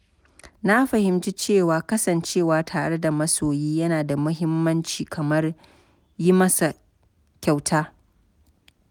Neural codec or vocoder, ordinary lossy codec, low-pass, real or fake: none; none; none; real